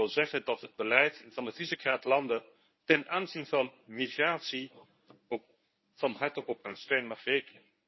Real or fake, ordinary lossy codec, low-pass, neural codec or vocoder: fake; MP3, 24 kbps; 7.2 kHz; codec, 24 kHz, 0.9 kbps, WavTokenizer, medium speech release version 1